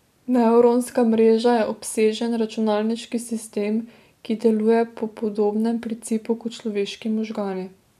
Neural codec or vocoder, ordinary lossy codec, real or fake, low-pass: none; none; real; 14.4 kHz